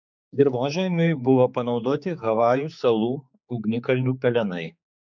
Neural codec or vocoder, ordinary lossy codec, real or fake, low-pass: codec, 16 kHz, 4 kbps, X-Codec, HuBERT features, trained on general audio; MP3, 64 kbps; fake; 7.2 kHz